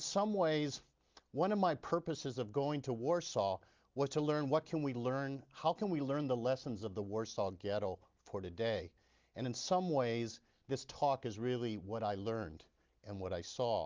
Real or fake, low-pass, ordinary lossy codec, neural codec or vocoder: real; 7.2 kHz; Opus, 32 kbps; none